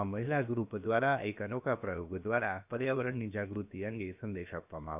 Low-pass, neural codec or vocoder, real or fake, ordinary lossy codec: 3.6 kHz; codec, 16 kHz, about 1 kbps, DyCAST, with the encoder's durations; fake; none